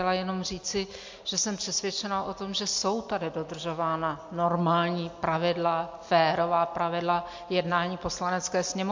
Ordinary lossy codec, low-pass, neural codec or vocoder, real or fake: MP3, 48 kbps; 7.2 kHz; none; real